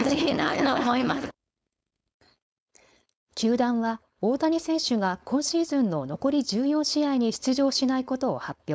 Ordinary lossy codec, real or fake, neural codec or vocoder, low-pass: none; fake; codec, 16 kHz, 4.8 kbps, FACodec; none